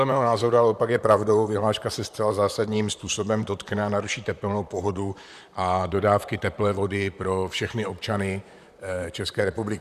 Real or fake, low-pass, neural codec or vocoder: fake; 14.4 kHz; vocoder, 44.1 kHz, 128 mel bands, Pupu-Vocoder